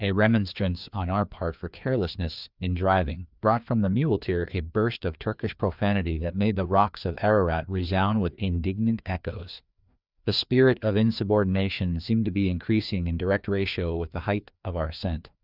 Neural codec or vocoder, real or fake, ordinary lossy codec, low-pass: codec, 16 kHz, 2 kbps, FreqCodec, larger model; fake; Opus, 64 kbps; 5.4 kHz